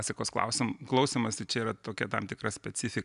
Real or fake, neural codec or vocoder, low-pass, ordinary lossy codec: real; none; 10.8 kHz; MP3, 96 kbps